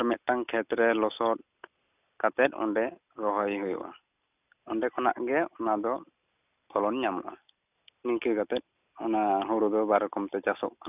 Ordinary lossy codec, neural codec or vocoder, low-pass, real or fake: none; none; 3.6 kHz; real